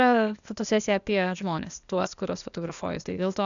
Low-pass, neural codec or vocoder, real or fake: 7.2 kHz; codec, 16 kHz, 0.8 kbps, ZipCodec; fake